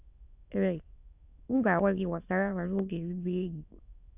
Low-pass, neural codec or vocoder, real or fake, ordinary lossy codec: 3.6 kHz; autoencoder, 22.05 kHz, a latent of 192 numbers a frame, VITS, trained on many speakers; fake; AAC, 32 kbps